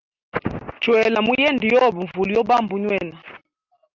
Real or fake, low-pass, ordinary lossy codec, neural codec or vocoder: real; 7.2 kHz; Opus, 24 kbps; none